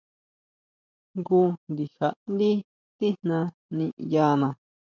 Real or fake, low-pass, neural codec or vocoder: fake; 7.2 kHz; vocoder, 44.1 kHz, 128 mel bands every 512 samples, BigVGAN v2